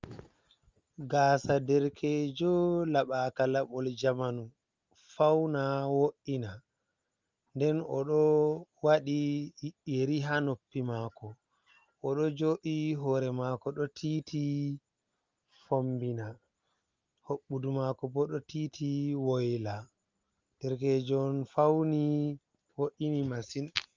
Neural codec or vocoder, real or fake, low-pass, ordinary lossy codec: none; real; 7.2 kHz; Opus, 32 kbps